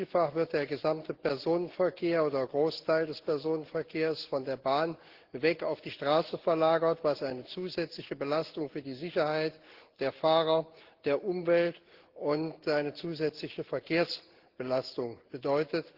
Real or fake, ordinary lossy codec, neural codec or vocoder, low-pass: real; Opus, 16 kbps; none; 5.4 kHz